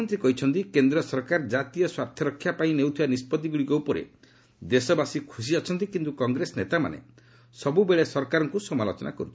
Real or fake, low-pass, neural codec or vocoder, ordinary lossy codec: real; none; none; none